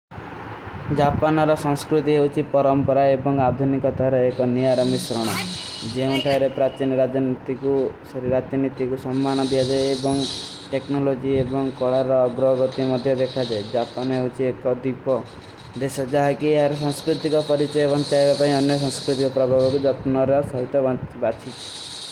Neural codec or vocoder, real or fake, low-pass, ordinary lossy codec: none; real; 19.8 kHz; Opus, 24 kbps